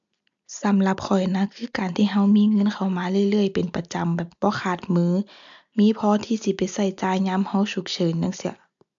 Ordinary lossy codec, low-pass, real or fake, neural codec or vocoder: MP3, 64 kbps; 7.2 kHz; real; none